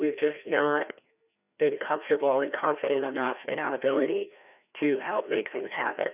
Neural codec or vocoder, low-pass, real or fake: codec, 16 kHz, 1 kbps, FreqCodec, larger model; 3.6 kHz; fake